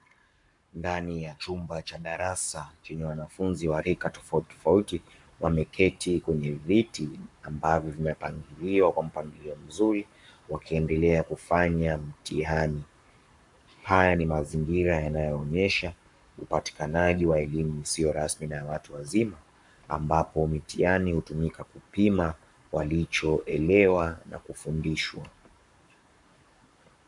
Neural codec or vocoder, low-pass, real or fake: codec, 44.1 kHz, 7.8 kbps, Pupu-Codec; 10.8 kHz; fake